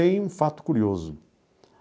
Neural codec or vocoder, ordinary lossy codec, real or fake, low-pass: none; none; real; none